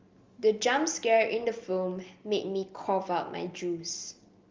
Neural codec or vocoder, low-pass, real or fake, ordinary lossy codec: none; 7.2 kHz; real; Opus, 32 kbps